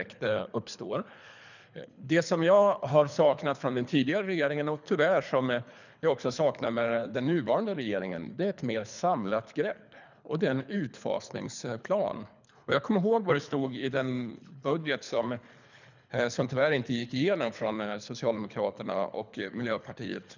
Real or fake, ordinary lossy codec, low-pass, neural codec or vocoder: fake; none; 7.2 kHz; codec, 24 kHz, 3 kbps, HILCodec